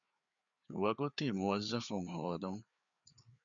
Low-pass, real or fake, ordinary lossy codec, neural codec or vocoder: 7.2 kHz; fake; MP3, 96 kbps; codec, 16 kHz, 4 kbps, FreqCodec, larger model